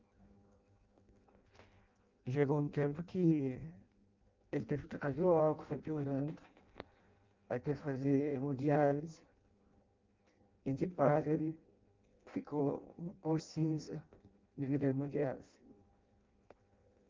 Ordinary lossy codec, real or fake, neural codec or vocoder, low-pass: Opus, 32 kbps; fake; codec, 16 kHz in and 24 kHz out, 0.6 kbps, FireRedTTS-2 codec; 7.2 kHz